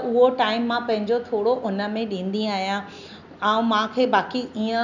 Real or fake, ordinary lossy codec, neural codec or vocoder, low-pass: real; none; none; 7.2 kHz